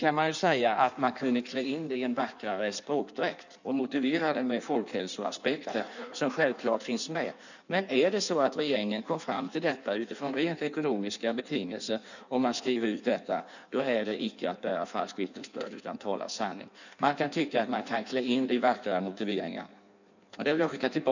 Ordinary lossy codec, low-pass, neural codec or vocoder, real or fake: none; 7.2 kHz; codec, 16 kHz in and 24 kHz out, 1.1 kbps, FireRedTTS-2 codec; fake